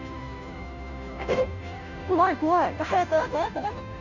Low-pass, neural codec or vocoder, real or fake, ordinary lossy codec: 7.2 kHz; codec, 16 kHz, 0.5 kbps, FunCodec, trained on Chinese and English, 25 frames a second; fake; none